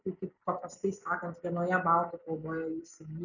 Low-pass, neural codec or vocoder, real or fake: 7.2 kHz; none; real